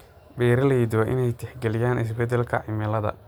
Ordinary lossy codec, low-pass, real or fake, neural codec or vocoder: none; none; real; none